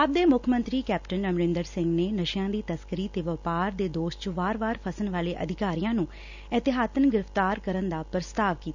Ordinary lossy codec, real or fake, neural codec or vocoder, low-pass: none; real; none; 7.2 kHz